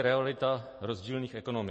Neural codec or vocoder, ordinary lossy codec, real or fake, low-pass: none; MP3, 32 kbps; real; 10.8 kHz